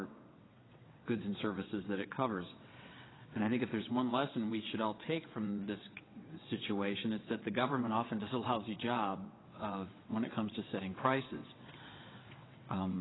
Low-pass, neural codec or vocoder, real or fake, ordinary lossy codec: 7.2 kHz; vocoder, 22.05 kHz, 80 mel bands, WaveNeXt; fake; AAC, 16 kbps